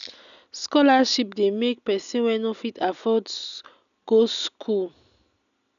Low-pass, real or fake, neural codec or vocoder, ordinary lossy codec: 7.2 kHz; real; none; none